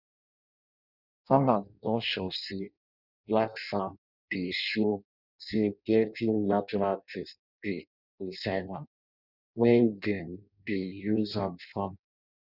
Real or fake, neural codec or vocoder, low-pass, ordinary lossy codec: fake; codec, 16 kHz in and 24 kHz out, 0.6 kbps, FireRedTTS-2 codec; 5.4 kHz; none